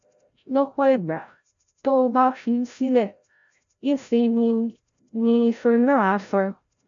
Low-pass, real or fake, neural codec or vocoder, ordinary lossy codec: 7.2 kHz; fake; codec, 16 kHz, 0.5 kbps, FreqCodec, larger model; none